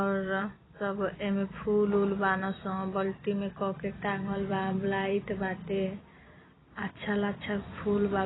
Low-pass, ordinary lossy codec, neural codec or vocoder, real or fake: 7.2 kHz; AAC, 16 kbps; vocoder, 44.1 kHz, 128 mel bands every 256 samples, BigVGAN v2; fake